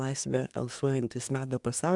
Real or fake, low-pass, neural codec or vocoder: fake; 10.8 kHz; codec, 24 kHz, 1 kbps, SNAC